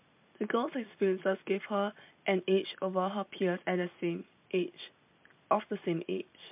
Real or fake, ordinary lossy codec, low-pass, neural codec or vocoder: real; MP3, 32 kbps; 3.6 kHz; none